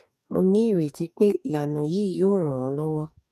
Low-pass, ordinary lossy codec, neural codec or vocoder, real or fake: 14.4 kHz; none; codec, 44.1 kHz, 2.6 kbps, DAC; fake